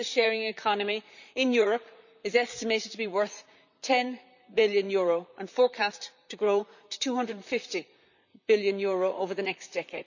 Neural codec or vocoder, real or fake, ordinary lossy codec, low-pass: vocoder, 44.1 kHz, 128 mel bands, Pupu-Vocoder; fake; none; 7.2 kHz